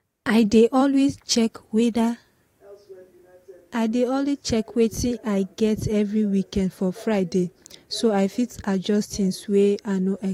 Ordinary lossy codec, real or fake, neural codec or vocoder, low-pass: AAC, 48 kbps; fake; vocoder, 44.1 kHz, 128 mel bands every 256 samples, BigVGAN v2; 19.8 kHz